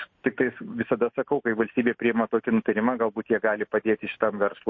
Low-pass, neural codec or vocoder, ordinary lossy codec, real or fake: 7.2 kHz; none; MP3, 32 kbps; real